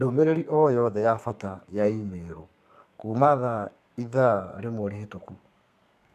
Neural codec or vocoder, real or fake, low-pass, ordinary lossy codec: codec, 32 kHz, 1.9 kbps, SNAC; fake; 14.4 kHz; none